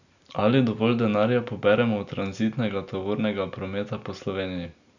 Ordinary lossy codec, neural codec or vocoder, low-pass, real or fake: none; none; 7.2 kHz; real